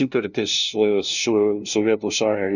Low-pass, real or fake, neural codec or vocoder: 7.2 kHz; fake; codec, 16 kHz, 0.5 kbps, FunCodec, trained on LibriTTS, 25 frames a second